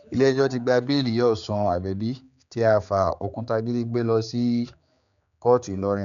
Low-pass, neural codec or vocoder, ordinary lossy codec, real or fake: 7.2 kHz; codec, 16 kHz, 4 kbps, X-Codec, HuBERT features, trained on general audio; none; fake